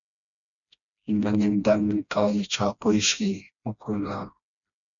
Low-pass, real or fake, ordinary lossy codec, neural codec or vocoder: 7.2 kHz; fake; MP3, 96 kbps; codec, 16 kHz, 1 kbps, FreqCodec, smaller model